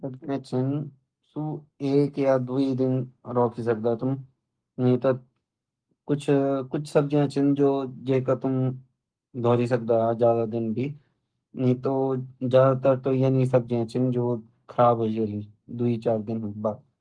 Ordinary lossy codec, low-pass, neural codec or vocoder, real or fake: Opus, 24 kbps; 9.9 kHz; codec, 44.1 kHz, 7.8 kbps, Pupu-Codec; fake